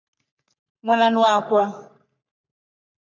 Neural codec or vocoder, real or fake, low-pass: codec, 44.1 kHz, 3.4 kbps, Pupu-Codec; fake; 7.2 kHz